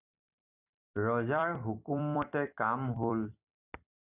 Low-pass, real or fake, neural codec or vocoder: 3.6 kHz; fake; vocoder, 44.1 kHz, 128 mel bands every 256 samples, BigVGAN v2